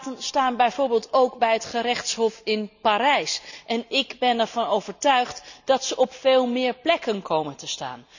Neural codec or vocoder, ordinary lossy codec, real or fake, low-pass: none; none; real; 7.2 kHz